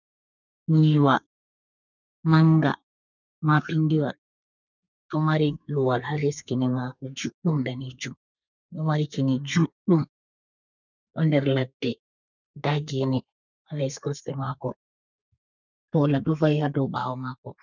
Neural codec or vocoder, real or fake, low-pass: codec, 32 kHz, 1.9 kbps, SNAC; fake; 7.2 kHz